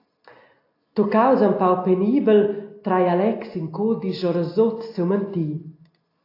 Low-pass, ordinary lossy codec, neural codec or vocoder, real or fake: 5.4 kHz; AAC, 32 kbps; none; real